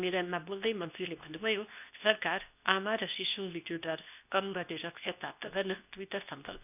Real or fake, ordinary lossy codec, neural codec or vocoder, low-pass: fake; none; codec, 24 kHz, 0.9 kbps, WavTokenizer, medium speech release version 2; 3.6 kHz